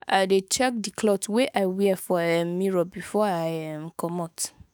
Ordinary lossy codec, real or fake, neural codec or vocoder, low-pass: none; fake; autoencoder, 48 kHz, 128 numbers a frame, DAC-VAE, trained on Japanese speech; none